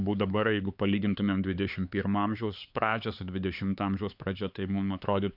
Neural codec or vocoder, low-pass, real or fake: codec, 16 kHz, 4 kbps, X-Codec, HuBERT features, trained on LibriSpeech; 5.4 kHz; fake